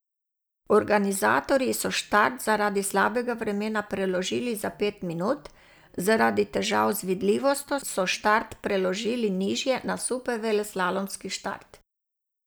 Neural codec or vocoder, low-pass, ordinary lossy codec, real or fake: none; none; none; real